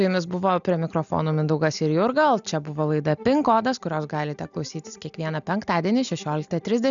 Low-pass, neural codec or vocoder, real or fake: 7.2 kHz; none; real